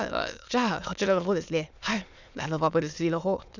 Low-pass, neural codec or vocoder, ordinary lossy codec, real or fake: 7.2 kHz; autoencoder, 22.05 kHz, a latent of 192 numbers a frame, VITS, trained on many speakers; none; fake